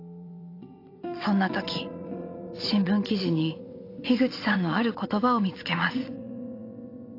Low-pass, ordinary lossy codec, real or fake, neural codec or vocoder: 5.4 kHz; AAC, 32 kbps; fake; vocoder, 22.05 kHz, 80 mel bands, WaveNeXt